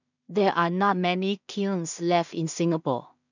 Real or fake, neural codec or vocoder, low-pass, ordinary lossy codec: fake; codec, 16 kHz in and 24 kHz out, 0.4 kbps, LongCat-Audio-Codec, two codebook decoder; 7.2 kHz; none